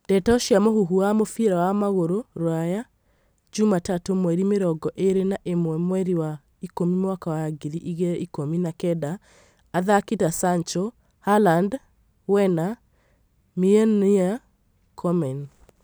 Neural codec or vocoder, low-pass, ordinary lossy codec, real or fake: none; none; none; real